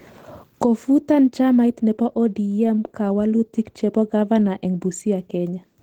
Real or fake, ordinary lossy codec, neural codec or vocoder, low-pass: real; Opus, 16 kbps; none; 19.8 kHz